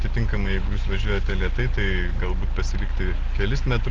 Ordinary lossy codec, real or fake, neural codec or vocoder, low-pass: Opus, 16 kbps; real; none; 7.2 kHz